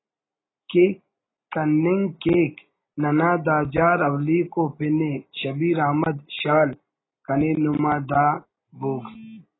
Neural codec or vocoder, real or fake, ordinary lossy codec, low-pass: none; real; AAC, 16 kbps; 7.2 kHz